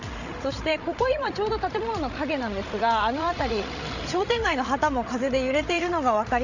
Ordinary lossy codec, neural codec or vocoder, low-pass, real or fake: none; codec, 16 kHz, 16 kbps, FreqCodec, larger model; 7.2 kHz; fake